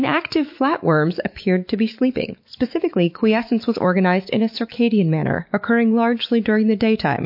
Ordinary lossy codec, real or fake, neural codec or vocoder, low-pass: MP3, 32 kbps; fake; autoencoder, 48 kHz, 128 numbers a frame, DAC-VAE, trained on Japanese speech; 5.4 kHz